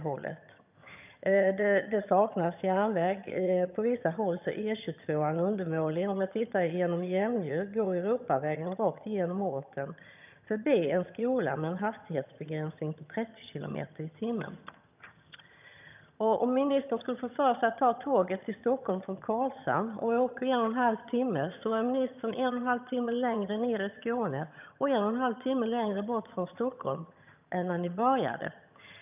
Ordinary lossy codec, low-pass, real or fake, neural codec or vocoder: none; 3.6 kHz; fake; vocoder, 22.05 kHz, 80 mel bands, HiFi-GAN